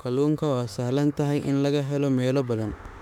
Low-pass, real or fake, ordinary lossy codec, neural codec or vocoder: 19.8 kHz; fake; none; autoencoder, 48 kHz, 32 numbers a frame, DAC-VAE, trained on Japanese speech